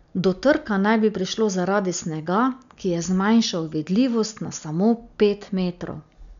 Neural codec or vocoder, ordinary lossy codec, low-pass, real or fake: none; none; 7.2 kHz; real